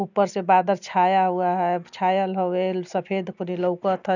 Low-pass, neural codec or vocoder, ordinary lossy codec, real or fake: 7.2 kHz; none; none; real